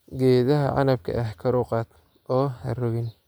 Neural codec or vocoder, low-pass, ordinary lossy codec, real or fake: none; none; none; real